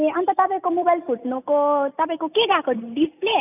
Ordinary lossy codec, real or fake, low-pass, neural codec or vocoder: none; real; 3.6 kHz; none